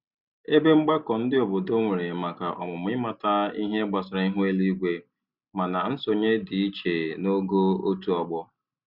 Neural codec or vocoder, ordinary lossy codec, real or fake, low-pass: none; Opus, 64 kbps; real; 5.4 kHz